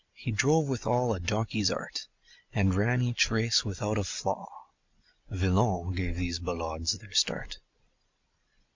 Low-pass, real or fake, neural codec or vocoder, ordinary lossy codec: 7.2 kHz; real; none; MP3, 64 kbps